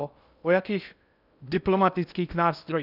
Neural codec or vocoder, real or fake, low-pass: codec, 16 kHz in and 24 kHz out, 0.6 kbps, FocalCodec, streaming, 2048 codes; fake; 5.4 kHz